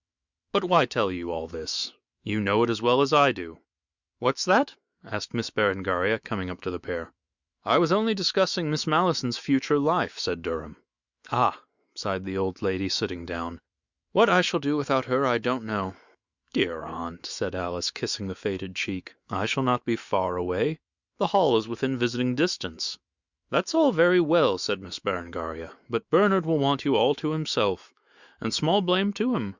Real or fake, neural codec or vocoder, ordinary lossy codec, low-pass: fake; autoencoder, 48 kHz, 128 numbers a frame, DAC-VAE, trained on Japanese speech; Opus, 64 kbps; 7.2 kHz